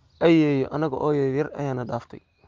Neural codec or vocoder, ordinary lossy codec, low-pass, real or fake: none; Opus, 32 kbps; 7.2 kHz; real